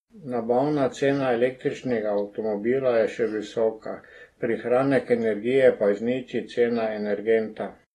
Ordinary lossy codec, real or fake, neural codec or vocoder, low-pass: AAC, 32 kbps; real; none; 19.8 kHz